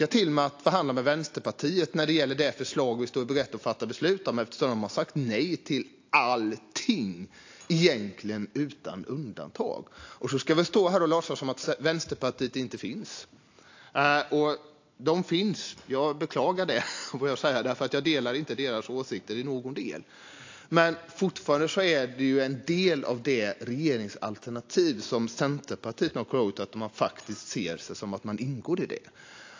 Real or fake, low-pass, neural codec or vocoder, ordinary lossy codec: real; 7.2 kHz; none; AAC, 48 kbps